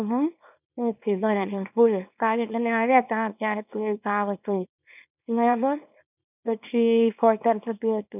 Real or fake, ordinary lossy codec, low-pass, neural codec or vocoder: fake; none; 3.6 kHz; codec, 24 kHz, 0.9 kbps, WavTokenizer, small release